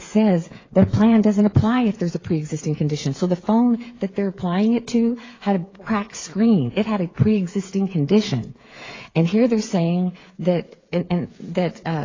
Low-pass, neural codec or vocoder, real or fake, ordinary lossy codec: 7.2 kHz; codec, 16 kHz, 8 kbps, FreqCodec, smaller model; fake; AAC, 32 kbps